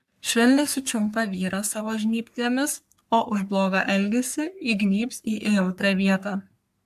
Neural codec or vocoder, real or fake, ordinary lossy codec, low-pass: codec, 44.1 kHz, 3.4 kbps, Pupu-Codec; fake; AAC, 96 kbps; 14.4 kHz